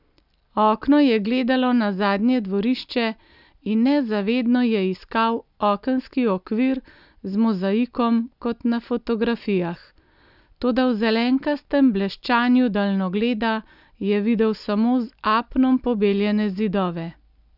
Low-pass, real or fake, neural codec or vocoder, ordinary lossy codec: 5.4 kHz; real; none; none